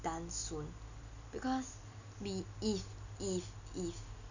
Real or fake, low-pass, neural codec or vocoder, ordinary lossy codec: real; 7.2 kHz; none; none